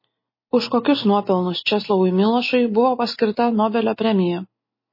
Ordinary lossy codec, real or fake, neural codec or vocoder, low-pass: MP3, 24 kbps; real; none; 5.4 kHz